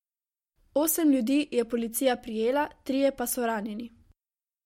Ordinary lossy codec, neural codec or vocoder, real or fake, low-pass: MP3, 64 kbps; none; real; 19.8 kHz